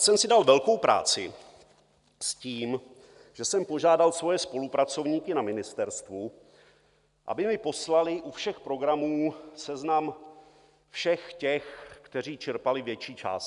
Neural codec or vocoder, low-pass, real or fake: none; 10.8 kHz; real